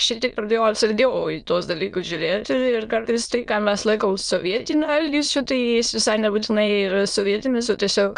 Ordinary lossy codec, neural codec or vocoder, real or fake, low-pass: Opus, 64 kbps; autoencoder, 22.05 kHz, a latent of 192 numbers a frame, VITS, trained on many speakers; fake; 9.9 kHz